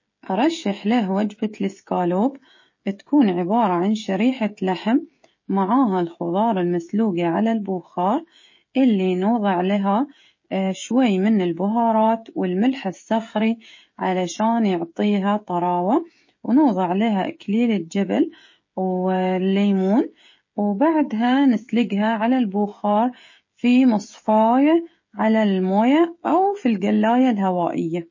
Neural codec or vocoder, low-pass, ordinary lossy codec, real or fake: codec, 16 kHz, 16 kbps, FreqCodec, smaller model; 7.2 kHz; MP3, 32 kbps; fake